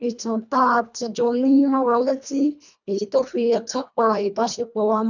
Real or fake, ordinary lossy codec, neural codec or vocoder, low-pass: fake; none; codec, 24 kHz, 1.5 kbps, HILCodec; 7.2 kHz